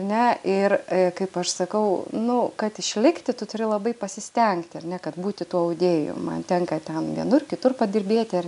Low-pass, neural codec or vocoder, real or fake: 10.8 kHz; none; real